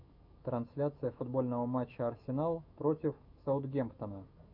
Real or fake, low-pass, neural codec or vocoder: fake; 5.4 kHz; autoencoder, 48 kHz, 128 numbers a frame, DAC-VAE, trained on Japanese speech